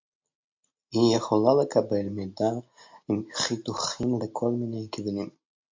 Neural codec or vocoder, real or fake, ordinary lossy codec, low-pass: none; real; MP3, 48 kbps; 7.2 kHz